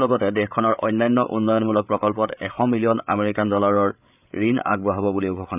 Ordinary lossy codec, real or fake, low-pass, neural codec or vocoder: none; fake; 3.6 kHz; codec, 16 kHz, 8 kbps, FreqCodec, larger model